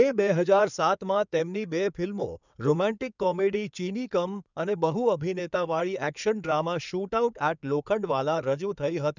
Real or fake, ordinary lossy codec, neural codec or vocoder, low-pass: fake; none; codec, 16 kHz in and 24 kHz out, 2.2 kbps, FireRedTTS-2 codec; 7.2 kHz